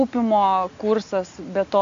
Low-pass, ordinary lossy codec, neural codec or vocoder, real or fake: 7.2 kHz; AAC, 64 kbps; none; real